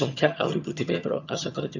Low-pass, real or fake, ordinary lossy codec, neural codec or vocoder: 7.2 kHz; fake; none; vocoder, 22.05 kHz, 80 mel bands, HiFi-GAN